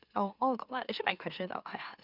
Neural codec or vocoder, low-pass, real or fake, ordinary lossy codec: autoencoder, 44.1 kHz, a latent of 192 numbers a frame, MeloTTS; 5.4 kHz; fake; Opus, 64 kbps